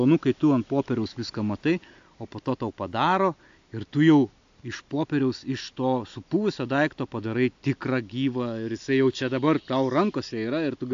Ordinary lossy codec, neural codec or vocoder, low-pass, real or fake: AAC, 64 kbps; none; 7.2 kHz; real